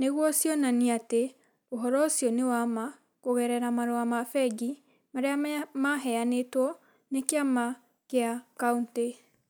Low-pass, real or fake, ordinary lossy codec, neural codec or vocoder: none; real; none; none